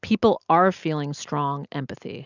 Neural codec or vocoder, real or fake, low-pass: none; real; 7.2 kHz